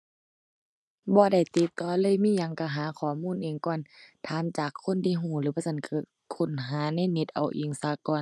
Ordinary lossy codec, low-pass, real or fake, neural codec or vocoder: none; none; real; none